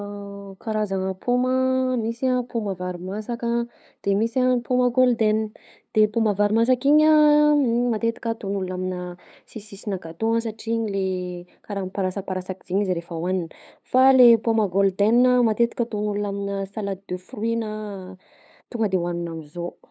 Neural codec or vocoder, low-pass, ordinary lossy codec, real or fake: codec, 16 kHz, 8 kbps, FunCodec, trained on LibriTTS, 25 frames a second; none; none; fake